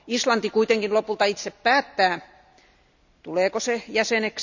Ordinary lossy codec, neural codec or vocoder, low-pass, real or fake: none; none; 7.2 kHz; real